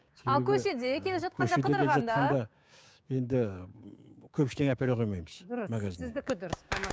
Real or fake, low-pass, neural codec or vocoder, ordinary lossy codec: fake; none; codec, 16 kHz, 6 kbps, DAC; none